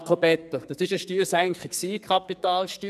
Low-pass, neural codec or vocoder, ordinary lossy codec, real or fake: 14.4 kHz; codec, 44.1 kHz, 2.6 kbps, SNAC; none; fake